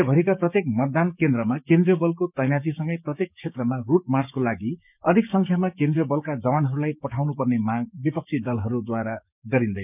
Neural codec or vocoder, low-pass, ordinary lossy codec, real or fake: codec, 16 kHz, 6 kbps, DAC; 3.6 kHz; none; fake